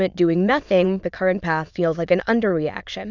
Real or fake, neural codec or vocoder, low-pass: fake; autoencoder, 22.05 kHz, a latent of 192 numbers a frame, VITS, trained on many speakers; 7.2 kHz